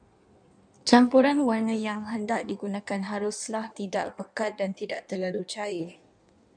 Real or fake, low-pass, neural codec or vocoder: fake; 9.9 kHz; codec, 16 kHz in and 24 kHz out, 1.1 kbps, FireRedTTS-2 codec